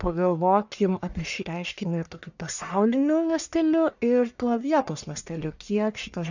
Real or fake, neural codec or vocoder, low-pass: fake; codec, 44.1 kHz, 1.7 kbps, Pupu-Codec; 7.2 kHz